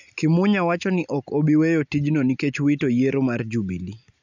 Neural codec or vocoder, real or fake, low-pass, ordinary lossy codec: none; real; 7.2 kHz; none